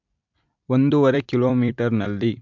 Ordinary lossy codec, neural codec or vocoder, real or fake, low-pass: MP3, 64 kbps; vocoder, 44.1 kHz, 128 mel bands every 256 samples, BigVGAN v2; fake; 7.2 kHz